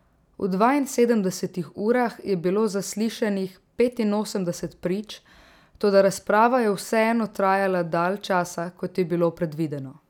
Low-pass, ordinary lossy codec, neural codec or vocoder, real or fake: 19.8 kHz; none; none; real